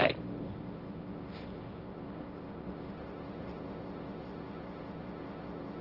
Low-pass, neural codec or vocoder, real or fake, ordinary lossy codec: 5.4 kHz; codec, 16 kHz, 1.1 kbps, Voila-Tokenizer; fake; Opus, 16 kbps